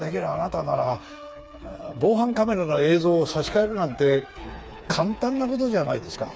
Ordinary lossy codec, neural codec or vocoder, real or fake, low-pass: none; codec, 16 kHz, 4 kbps, FreqCodec, smaller model; fake; none